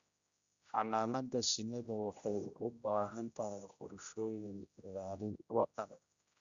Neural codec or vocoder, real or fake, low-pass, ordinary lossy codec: codec, 16 kHz, 0.5 kbps, X-Codec, HuBERT features, trained on general audio; fake; 7.2 kHz; Opus, 64 kbps